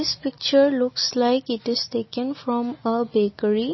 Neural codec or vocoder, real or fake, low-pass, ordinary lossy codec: none; real; 7.2 kHz; MP3, 24 kbps